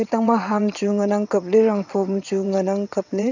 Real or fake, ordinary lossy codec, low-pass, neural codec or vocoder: fake; none; 7.2 kHz; vocoder, 22.05 kHz, 80 mel bands, WaveNeXt